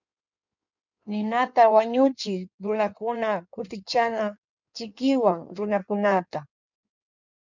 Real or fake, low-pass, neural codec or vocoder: fake; 7.2 kHz; codec, 16 kHz in and 24 kHz out, 1.1 kbps, FireRedTTS-2 codec